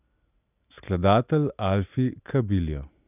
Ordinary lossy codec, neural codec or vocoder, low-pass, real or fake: none; none; 3.6 kHz; real